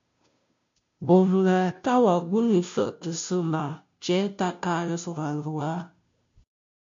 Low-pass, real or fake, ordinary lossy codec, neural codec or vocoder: 7.2 kHz; fake; MP3, 48 kbps; codec, 16 kHz, 0.5 kbps, FunCodec, trained on Chinese and English, 25 frames a second